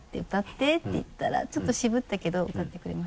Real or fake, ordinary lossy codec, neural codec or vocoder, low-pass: real; none; none; none